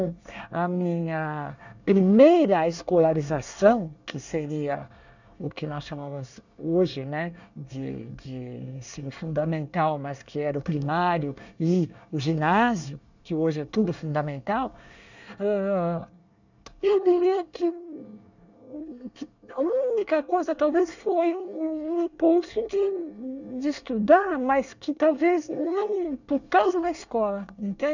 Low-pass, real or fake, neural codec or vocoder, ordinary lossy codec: 7.2 kHz; fake; codec, 24 kHz, 1 kbps, SNAC; none